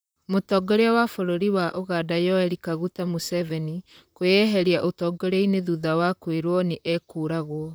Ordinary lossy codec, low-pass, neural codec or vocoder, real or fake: none; none; vocoder, 44.1 kHz, 128 mel bands, Pupu-Vocoder; fake